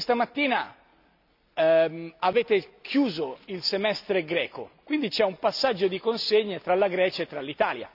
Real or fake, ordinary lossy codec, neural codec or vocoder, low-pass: real; none; none; 5.4 kHz